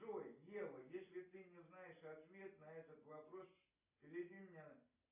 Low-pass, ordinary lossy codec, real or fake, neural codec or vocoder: 3.6 kHz; AAC, 32 kbps; real; none